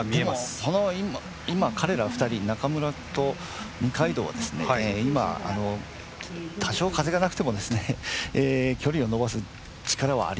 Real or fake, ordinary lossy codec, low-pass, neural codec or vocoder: real; none; none; none